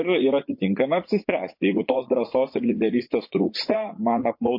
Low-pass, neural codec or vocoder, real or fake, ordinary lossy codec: 5.4 kHz; vocoder, 44.1 kHz, 80 mel bands, Vocos; fake; MP3, 32 kbps